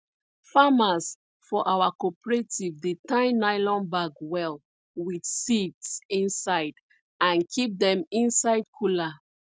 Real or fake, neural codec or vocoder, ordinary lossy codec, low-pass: real; none; none; none